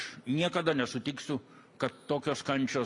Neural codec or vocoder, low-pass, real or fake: none; 10.8 kHz; real